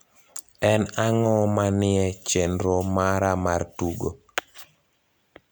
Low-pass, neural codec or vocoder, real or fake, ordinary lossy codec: none; none; real; none